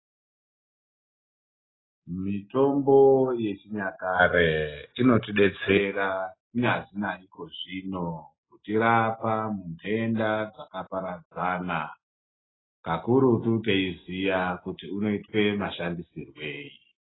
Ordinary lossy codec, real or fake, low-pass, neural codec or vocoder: AAC, 16 kbps; real; 7.2 kHz; none